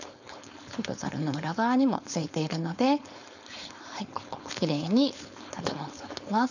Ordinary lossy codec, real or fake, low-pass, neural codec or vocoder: none; fake; 7.2 kHz; codec, 16 kHz, 4.8 kbps, FACodec